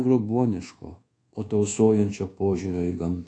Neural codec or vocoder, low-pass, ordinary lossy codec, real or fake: codec, 24 kHz, 1.2 kbps, DualCodec; 9.9 kHz; AAC, 48 kbps; fake